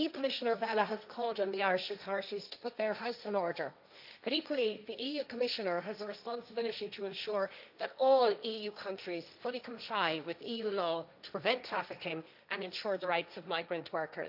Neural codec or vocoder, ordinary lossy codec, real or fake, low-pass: codec, 16 kHz, 1.1 kbps, Voila-Tokenizer; none; fake; 5.4 kHz